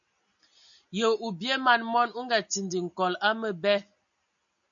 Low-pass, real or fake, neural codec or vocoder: 7.2 kHz; real; none